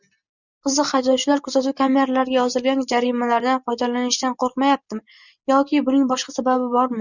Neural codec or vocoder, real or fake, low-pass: none; real; 7.2 kHz